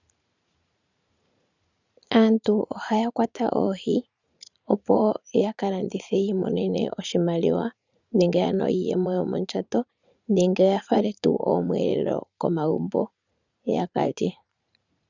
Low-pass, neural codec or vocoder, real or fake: 7.2 kHz; vocoder, 44.1 kHz, 80 mel bands, Vocos; fake